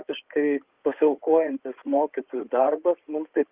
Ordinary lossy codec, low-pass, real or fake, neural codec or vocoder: Opus, 32 kbps; 3.6 kHz; fake; codec, 16 kHz in and 24 kHz out, 2.2 kbps, FireRedTTS-2 codec